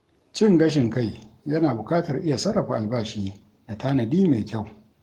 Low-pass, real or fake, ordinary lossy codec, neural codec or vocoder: 19.8 kHz; fake; Opus, 24 kbps; codec, 44.1 kHz, 7.8 kbps, Pupu-Codec